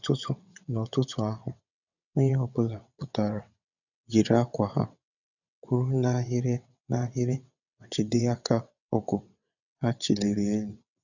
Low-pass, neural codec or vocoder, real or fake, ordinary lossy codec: 7.2 kHz; vocoder, 22.05 kHz, 80 mel bands, WaveNeXt; fake; none